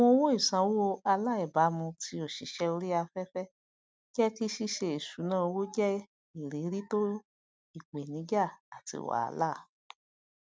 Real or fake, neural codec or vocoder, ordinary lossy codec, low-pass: real; none; none; none